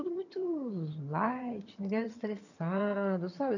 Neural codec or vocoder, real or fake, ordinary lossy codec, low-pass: vocoder, 22.05 kHz, 80 mel bands, HiFi-GAN; fake; none; 7.2 kHz